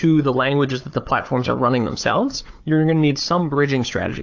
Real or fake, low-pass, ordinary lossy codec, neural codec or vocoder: fake; 7.2 kHz; AAC, 48 kbps; codec, 16 kHz, 4 kbps, FunCodec, trained on Chinese and English, 50 frames a second